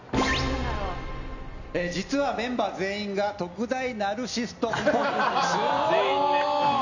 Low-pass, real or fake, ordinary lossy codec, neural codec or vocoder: 7.2 kHz; real; none; none